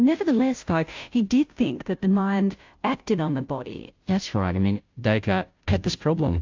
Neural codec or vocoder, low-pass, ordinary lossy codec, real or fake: codec, 16 kHz, 0.5 kbps, FunCodec, trained on Chinese and English, 25 frames a second; 7.2 kHz; AAC, 48 kbps; fake